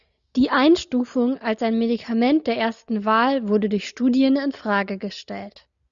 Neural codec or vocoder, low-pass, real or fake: none; 7.2 kHz; real